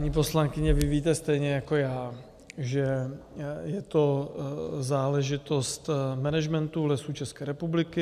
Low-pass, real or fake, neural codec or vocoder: 14.4 kHz; real; none